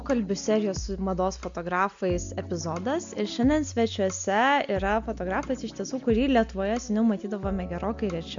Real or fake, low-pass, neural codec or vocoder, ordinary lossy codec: real; 7.2 kHz; none; MP3, 64 kbps